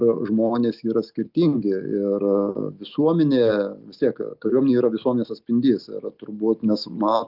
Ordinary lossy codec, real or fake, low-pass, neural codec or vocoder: Opus, 32 kbps; real; 5.4 kHz; none